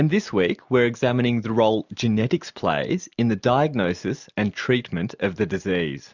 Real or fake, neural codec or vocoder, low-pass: real; none; 7.2 kHz